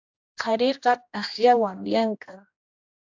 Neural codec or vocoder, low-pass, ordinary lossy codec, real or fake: codec, 16 kHz, 1 kbps, X-Codec, HuBERT features, trained on general audio; 7.2 kHz; AAC, 48 kbps; fake